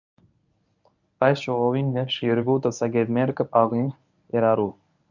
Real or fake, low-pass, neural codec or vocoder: fake; 7.2 kHz; codec, 24 kHz, 0.9 kbps, WavTokenizer, medium speech release version 1